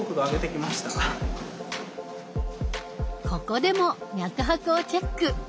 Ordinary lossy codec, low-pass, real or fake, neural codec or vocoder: none; none; real; none